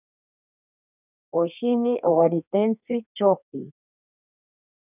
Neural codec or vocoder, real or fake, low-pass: codec, 32 kHz, 1.9 kbps, SNAC; fake; 3.6 kHz